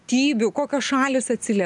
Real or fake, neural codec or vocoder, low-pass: real; none; 10.8 kHz